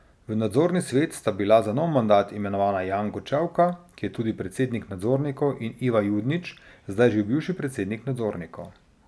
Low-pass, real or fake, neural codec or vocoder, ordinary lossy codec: none; real; none; none